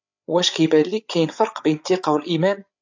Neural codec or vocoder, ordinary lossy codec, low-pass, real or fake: codec, 16 kHz, 8 kbps, FreqCodec, larger model; AAC, 48 kbps; 7.2 kHz; fake